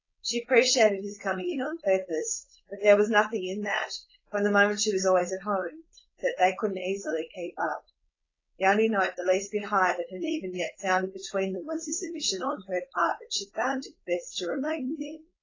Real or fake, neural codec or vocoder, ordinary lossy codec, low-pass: fake; codec, 16 kHz, 4.8 kbps, FACodec; AAC, 32 kbps; 7.2 kHz